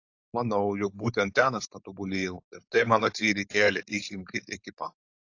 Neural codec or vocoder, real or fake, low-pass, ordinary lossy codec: codec, 16 kHz, 4.8 kbps, FACodec; fake; 7.2 kHz; AAC, 48 kbps